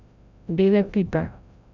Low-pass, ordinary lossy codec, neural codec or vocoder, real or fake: 7.2 kHz; none; codec, 16 kHz, 0.5 kbps, FreqCodec, larger model; fake